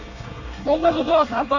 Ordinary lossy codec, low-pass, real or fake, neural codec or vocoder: none; 7.2 kHz; fake; codec, 24 kHz, 1 kbps, SNAC